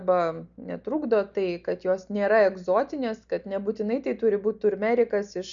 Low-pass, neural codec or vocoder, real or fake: 7.2 kHz; none; real